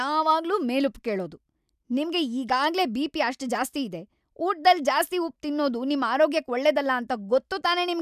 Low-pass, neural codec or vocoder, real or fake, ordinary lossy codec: 14.4 kHz; none; real; none